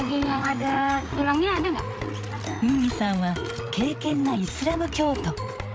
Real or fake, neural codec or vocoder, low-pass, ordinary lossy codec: fake; codec, 16 kHz, 8 kbps, FreqCodec, larger model; none; none